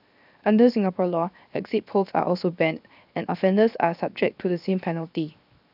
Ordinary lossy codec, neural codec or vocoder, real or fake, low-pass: none; codec, 16 kHz, 0.7 kbps, FocalCodec; fake; 5.4 kHz